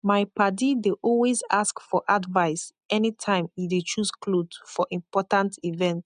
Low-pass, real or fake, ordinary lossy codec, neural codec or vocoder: 9.9 kHz; real; none; none